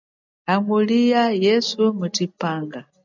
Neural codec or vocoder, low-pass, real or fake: none; 7.2 kHz; real